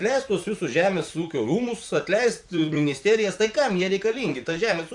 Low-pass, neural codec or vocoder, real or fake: 10.8 kHz; vocoder, 44.1 kHz, 128 mel bands, Pupu-Vocoder; fake